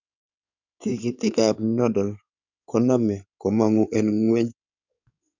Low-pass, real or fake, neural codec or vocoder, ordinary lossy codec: 7.2 kHz; fake; codec, 16 kHz in and 24 kHz out, 2.2 kbps, FireRedTTS-2 codec; none